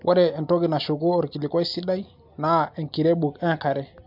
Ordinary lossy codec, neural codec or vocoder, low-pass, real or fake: MP3, 48 kbps; none; 5.4 kHz; real